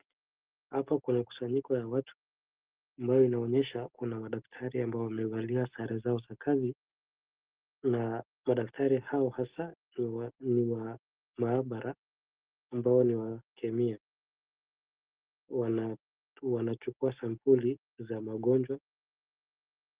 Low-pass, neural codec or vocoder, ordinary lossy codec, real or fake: 3.6 kHz; none; Opus, 16 kbps; real